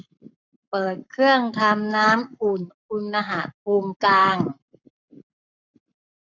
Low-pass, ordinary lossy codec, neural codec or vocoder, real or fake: 7.2 kHz; none; codec, 44.1 kHz, 7.8 kbps, Pupu-Codec; fake